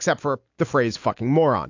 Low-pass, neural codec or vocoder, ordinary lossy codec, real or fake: 7.2 kHz; none; AAC, 48 kbps; real